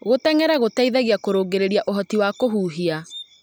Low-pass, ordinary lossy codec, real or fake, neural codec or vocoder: none; none; real; none